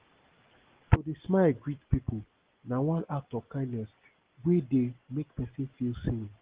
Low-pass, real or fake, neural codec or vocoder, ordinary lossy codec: 3.6 kHz; real; none; Opus, 16 kbps